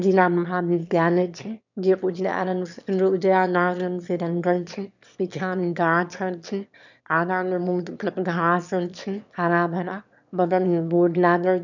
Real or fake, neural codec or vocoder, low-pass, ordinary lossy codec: fake; autoencoder, 22.05 kHz, a latent of 192 numbers a frame, VITS, trained on one speaker; 7.2 kHz; none